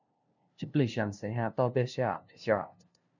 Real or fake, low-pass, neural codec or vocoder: fake; 7.2 kHz; codec, 16 kHz, 0.5 kbps, FunCodec, trained on LibriTTS, 25 frames a second